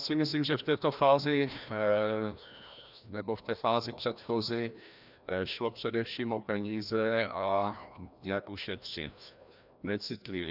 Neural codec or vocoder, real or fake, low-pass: codec, 16 kHz, 1 kbps, FreqCodec, larger model; fake; 5.4 kHz